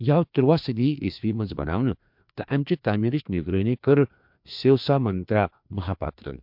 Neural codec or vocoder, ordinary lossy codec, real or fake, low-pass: codec, 16 kHz, 2 kbps, FreqCodec, larger model; MP3, 48 kbps; fake; 5.4 kHz